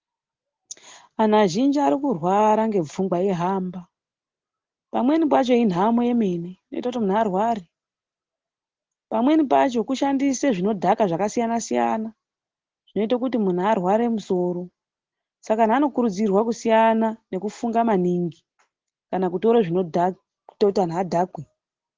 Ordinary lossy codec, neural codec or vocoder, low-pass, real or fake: Opus, 16 kbps; none; 7.2 kHz; real